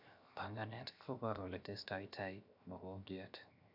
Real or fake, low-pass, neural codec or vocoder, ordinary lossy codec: fake; 5.4 kHz; codec, 16 kHz, 0.7 kbps, FocalCodec; none